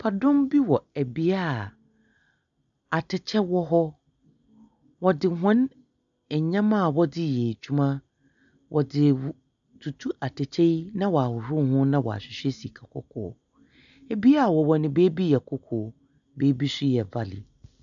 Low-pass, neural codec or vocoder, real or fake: 7.2 kHz; none; real